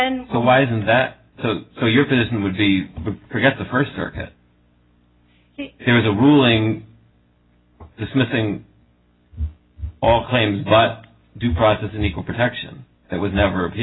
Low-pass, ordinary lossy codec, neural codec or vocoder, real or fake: 7.2 kHz; AAC, 16 kbps; none; real